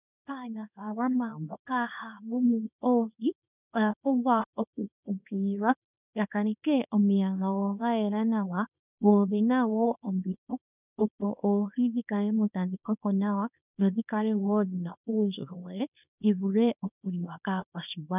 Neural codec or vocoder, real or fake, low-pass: codec, 24 kHz, 0.9 kbps, WavTokenizer, small release; fake; 3.6 kHz